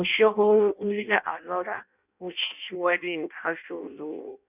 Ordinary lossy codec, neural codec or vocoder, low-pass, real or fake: none; codec, 16 kHz in and 24 kHz out, 0.6 kbps, FireRedTTS-2 codec; 3.6 kHz; fake